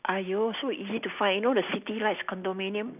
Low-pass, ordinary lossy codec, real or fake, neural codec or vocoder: 3.6 kHz; none; real; none